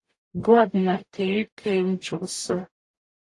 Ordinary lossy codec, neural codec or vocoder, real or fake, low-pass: AAC, 48 kbps; codec, 44.1 kHz, 0.9 kbps, DAC; fake; 10.8 kHz